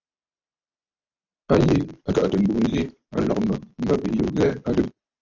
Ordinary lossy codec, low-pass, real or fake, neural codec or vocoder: AAC, 48 kbps; 7.2 kHz; real; none